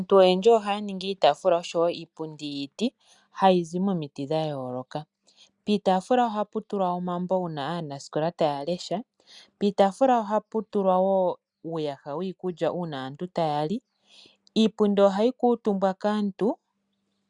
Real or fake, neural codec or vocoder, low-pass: real; none; 10.8 kHz